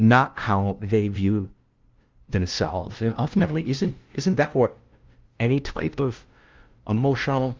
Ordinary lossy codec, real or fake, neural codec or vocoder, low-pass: Opus, 32 kbps; fake; codec, 16 kHz, 0.5 kbps, FunCodec, trained on LibriTTS, 25 frames a second; 7.2 kHz